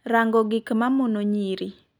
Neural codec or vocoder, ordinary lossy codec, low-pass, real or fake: none; none; 19.8 kHz; real